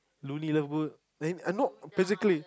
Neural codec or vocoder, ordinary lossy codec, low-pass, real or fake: none; none; none; real